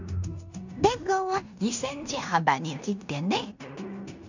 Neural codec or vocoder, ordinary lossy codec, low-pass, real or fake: codec, 16 kHz in and 24 kHz out, 0.9 kbps, LongCat-Audio-Codec, fine tuned four codebook decoder; none; 7.2 kHz; fake